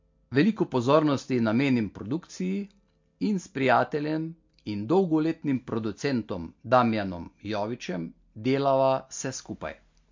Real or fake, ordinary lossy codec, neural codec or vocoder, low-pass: real; MP3, 48 kbps; none; 7.2 kHz